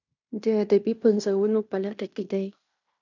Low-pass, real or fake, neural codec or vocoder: 7.2 kHz; fake; codec, 16 kHz in and 24 kHz out, 0.9 kbps, LongCat-Audio-Codec, fine tuned four codebook decoder